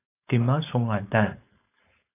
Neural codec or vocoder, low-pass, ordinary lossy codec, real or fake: codec, 16 kHz, 4.8 kbps, FACodec; 3.6 kHz; AAC, 16 kbps; fake